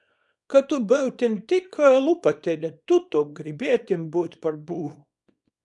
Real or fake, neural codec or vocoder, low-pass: fake; codec, 24 kHz, 0.9 kbps, WavTokenizer, small release; 10.8 kHz